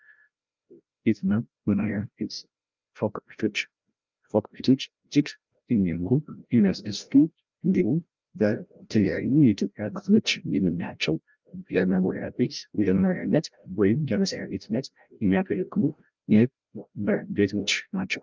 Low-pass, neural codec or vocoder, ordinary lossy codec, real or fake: 7.2 kHz; codec, 16 kHz, 0.5 kbps, FreqCodec, larger model; Opus, 24 kbps; fake